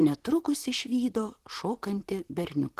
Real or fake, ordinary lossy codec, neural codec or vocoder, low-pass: fake; Opus, 16 kbps; vocoder, 44.1 kHz, 128 mel bands, Pupu-Vocoder; 14.4 kHz